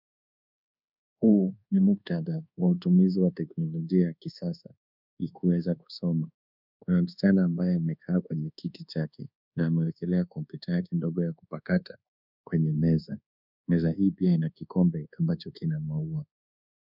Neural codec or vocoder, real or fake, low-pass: codec, 24 kHz, 1.2 kbps, DualCodec; fake; 5.4 kHz